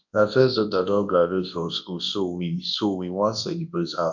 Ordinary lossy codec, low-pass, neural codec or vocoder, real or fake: MP3, 64 kbps; 7.2 kHz; codec, 24 kHz, 0.9 kbps, WavTokenizer, large speech release; fake